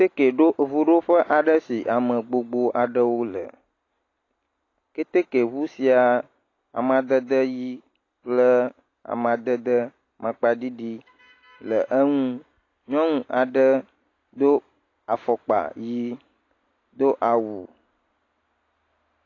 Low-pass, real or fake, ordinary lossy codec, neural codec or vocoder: 7.2 kHz; real; AAC, 32 kbps; none